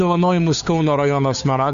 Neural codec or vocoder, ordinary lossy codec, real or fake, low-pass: codec, 16 kHz, 2 kbps, FunCodec, trained on Chinese and English, 25 frames a second; MP3, 64 kbps; fake; 7.2 kHz